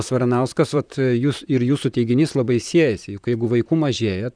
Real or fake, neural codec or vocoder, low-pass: fake; vocoder, 44.1 kHz, 128 mel bands, Pupu-Vocoder; 9.9 kHz